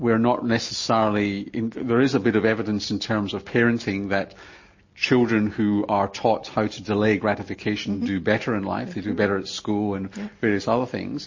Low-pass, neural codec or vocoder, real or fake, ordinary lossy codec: 7.2 kHz; none; real; MP3, 32 kbps